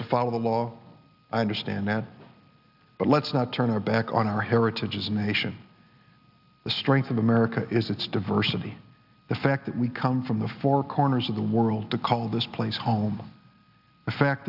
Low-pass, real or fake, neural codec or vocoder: 5.4 kHz; real; none